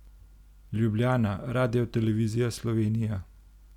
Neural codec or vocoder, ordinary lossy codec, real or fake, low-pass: none; none; real; 19.8 kHz